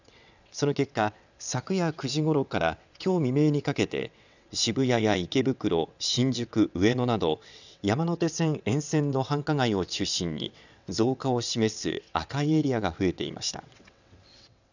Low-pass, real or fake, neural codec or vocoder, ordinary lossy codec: 7.2 kHz; fake; vocoder, 22.05 kHz, 80 mel bands, WaveNeXt; none